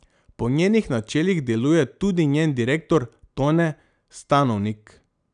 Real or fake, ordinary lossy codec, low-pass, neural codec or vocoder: real; none; 9.9 kHz; none